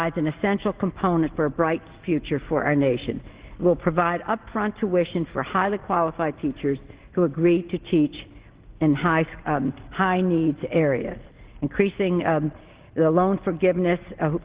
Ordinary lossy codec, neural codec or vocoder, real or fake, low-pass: Opus, 16 kbps; none; real; 3.6 kHz